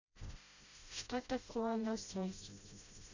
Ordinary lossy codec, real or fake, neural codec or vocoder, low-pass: Opus, 64 kbps; fake; codec, 16 kHz, 0.5 kbps, FreqCodec, smaller model; 7.2 kHz